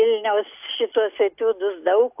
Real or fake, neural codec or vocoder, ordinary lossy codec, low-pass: real; none; AAC, 32 kbps; 3.6 kHz